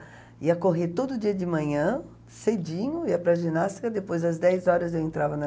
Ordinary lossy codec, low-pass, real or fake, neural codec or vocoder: none; none; real; none